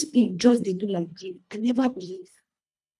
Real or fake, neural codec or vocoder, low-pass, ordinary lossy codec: fake; codec, 24 kHz, 1.5 kbps, HILCodec; none; none